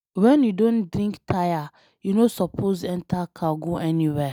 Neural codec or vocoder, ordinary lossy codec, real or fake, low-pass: none; none; real; none